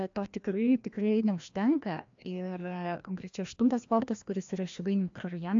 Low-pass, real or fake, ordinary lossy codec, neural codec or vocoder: 7.2 kHz; fake; AAC, 64 kbps; codec, 16 kHz, 1 kbps, FreqCodec, larger model